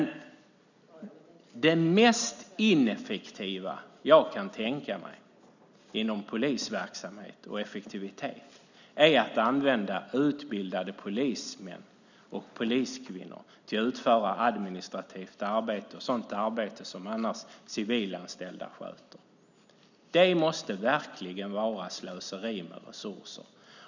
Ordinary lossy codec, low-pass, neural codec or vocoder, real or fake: none; 7.2 kHz; none; real